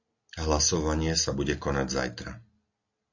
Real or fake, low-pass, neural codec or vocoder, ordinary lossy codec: real; 7.2 kHz; none; AAC, 48 kbps